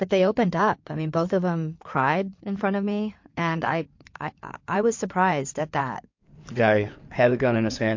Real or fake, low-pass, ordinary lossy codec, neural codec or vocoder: fake; 7.2 kHz; MP3, 48 kbps; codec, 16 kHz in and 24 kHz out, 2.2 kbps, FireRedTTS-2 codec